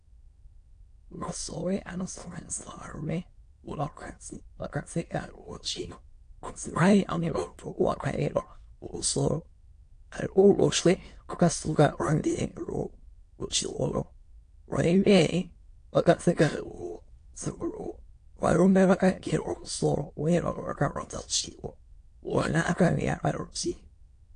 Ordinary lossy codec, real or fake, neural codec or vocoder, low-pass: AAC, 48 kbps; fake; autoencoder, 22.05 kHz, a latent of 192 numbers a frame, VITS, trained on many speakers; 9.9 kHz